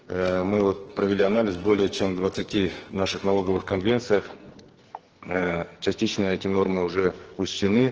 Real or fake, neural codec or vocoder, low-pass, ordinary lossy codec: fake; codec, 44.1 kHz, 2.6 kbps, SNAC; 7.2 kHz; Opus, 16 kbps